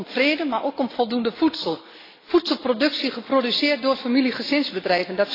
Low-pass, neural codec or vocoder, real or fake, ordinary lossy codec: 5.4 kHz; none; real; AAC, 24 kbps